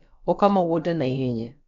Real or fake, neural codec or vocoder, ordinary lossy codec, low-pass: fake; codec, 16 kHz, about 1 kbps, DyCAST, with the encoder's durations; MP3, 64 kbps; 7.2 kHz